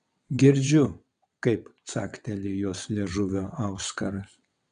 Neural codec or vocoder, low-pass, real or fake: vocoder, 22.05 kHz, 80 mel bands, WaveNeXt; 9.9 kHz; fake